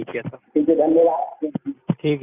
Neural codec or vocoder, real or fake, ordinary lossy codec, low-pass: none; real; none; 3.6 kHz